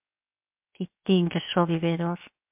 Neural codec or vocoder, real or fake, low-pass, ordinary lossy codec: codec, 16 kHz, 0.7 kbps, FocalCodec; fake; 3.6 kHz; MP3, 32 kbps